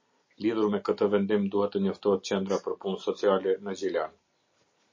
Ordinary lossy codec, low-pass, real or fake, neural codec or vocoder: MP3, 32 kbps; 7.2 kHz; real; none